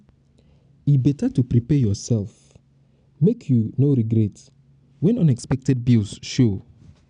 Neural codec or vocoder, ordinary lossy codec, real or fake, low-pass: none; none; real; 9.9 kHz